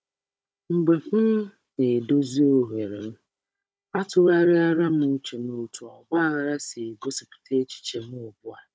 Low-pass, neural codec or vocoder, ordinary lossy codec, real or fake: none; codec, 16 kHz, 16 kbps, FunCodec, trained on Chinese and English, 50 frames a second; none; fake